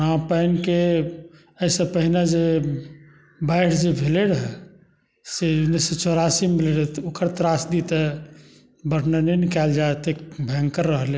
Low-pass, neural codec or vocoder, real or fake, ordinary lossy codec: none; none; real; none